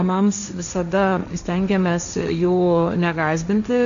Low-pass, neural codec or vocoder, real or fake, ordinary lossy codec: 7.2 kHz; codec, 16 kHz, 1.1 kbps, Voila-Tokenizer; fake; AAC, 96 kbps